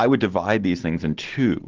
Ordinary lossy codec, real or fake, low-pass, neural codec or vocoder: Opus, 16 kbps; real; 7.2 kHz; none